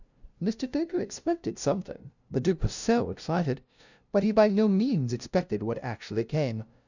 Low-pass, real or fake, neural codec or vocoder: 7.2 kHz; fake; codec, 16 kHz, 0.5 kbps, FunCodec, trained on LibriTTS, 25 frames a second